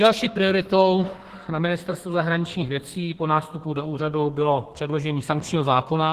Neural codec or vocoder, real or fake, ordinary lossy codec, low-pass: codec, 32 kHz, 1.9 kbps, SNAC; fake; Opus, 16 kbps; 14.4 kHz